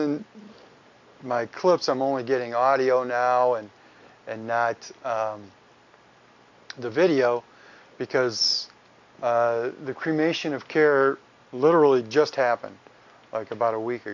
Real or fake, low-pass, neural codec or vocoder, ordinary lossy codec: real; 7.2 kHz; none; MP3, 64 kbps